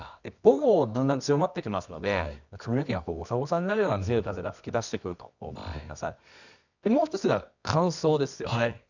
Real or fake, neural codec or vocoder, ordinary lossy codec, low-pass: fake; codec, 24 kHz, 0.9 kbps, WavTokenizer, medium music audio release; none; 7.2 kHz